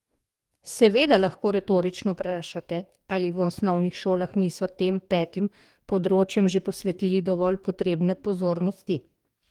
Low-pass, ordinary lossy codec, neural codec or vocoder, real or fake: 19.8 kHz; Opus, 32 kbps; codec, 44.1 kHz, 2.6 kbps, DAC; fake